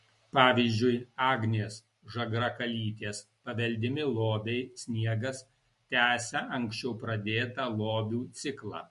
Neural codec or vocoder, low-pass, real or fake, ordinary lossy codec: none; 14.4 kHz; real; MP3, 48 kbps